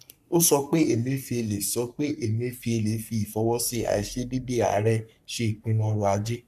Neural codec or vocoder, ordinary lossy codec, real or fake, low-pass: codec, 44.1 kHz, 3.4 kbps, Pupu-Codec; none; fake; 14.4 kHz